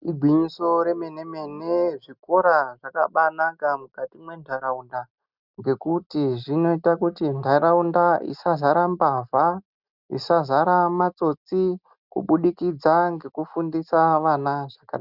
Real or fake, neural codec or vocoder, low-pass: real; none; 5.4 kHz